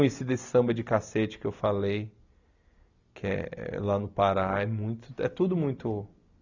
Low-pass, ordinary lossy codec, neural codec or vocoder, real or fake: 7.2 kHz; none; none; real